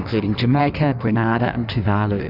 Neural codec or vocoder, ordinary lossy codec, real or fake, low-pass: codec, 16 kHz in and 24 kHz out, 1.1 kbps, FireRedTTS-2 codec; Opus, 64 kbps; fake; 5.4 kHz